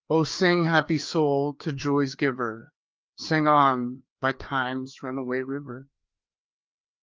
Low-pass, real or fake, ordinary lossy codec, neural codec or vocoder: 7.2 kHz; fake; Opus, 32 kbps; codec, 16 kHz, 2 kbps, FreqCodec, larger model